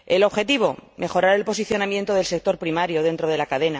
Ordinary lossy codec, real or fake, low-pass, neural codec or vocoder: none; real; none; none